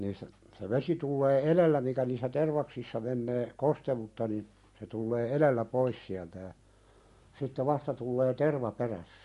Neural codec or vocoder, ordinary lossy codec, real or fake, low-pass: none; MP3, 48 kbps; real; 14.4 kHz